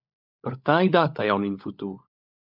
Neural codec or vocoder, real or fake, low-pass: codec, 16 kHz, 16 kbps, FunCodec, trained on LibriTTS, 50 frames a second; fake; 5.4 kHz